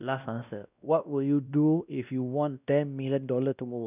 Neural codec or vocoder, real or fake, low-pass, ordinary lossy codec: codec, 16 kHz, 1 kbps, X-Codec, WavLM features, trained on Multilingual LibriSpeech; fake; 3.6 kHz; none